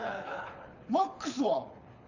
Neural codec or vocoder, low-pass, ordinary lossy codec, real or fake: codec, 24 kHz, 6 kbps, HILCodec; 7.2 kHz; AAC, 48 kbps; fake